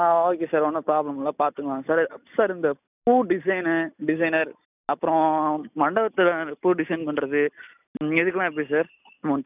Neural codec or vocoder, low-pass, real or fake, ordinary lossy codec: none; 3.6 kHz; real; none